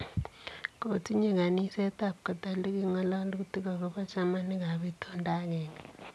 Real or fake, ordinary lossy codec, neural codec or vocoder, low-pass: real; none; none; none